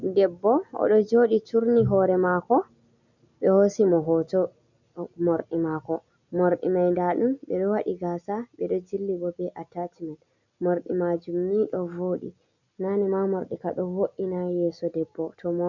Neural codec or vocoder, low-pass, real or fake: none; 7.2 kHz; real